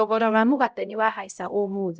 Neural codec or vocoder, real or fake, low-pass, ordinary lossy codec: codec, 16 kHz, 0.5 kbps, X-Codec, HuBERT features, trained on LibriSpeech; fake; none; none